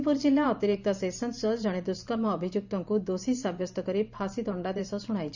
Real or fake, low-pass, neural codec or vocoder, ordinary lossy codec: fake; 7.2 kHz; vocoder, 44.1 kHz, 128 mel bands every 512 samples, BigVGAN v2; none